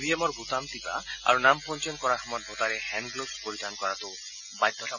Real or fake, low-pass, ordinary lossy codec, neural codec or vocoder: real; 7.2 kHz; none; none